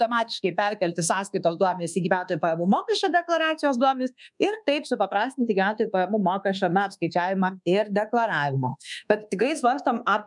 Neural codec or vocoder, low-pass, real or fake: codec, 24 kHz, 1.2 kbps, DualCodec; 10.8 kHz; fake